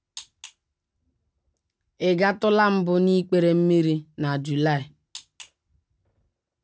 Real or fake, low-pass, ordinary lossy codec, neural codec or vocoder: real; none; none; none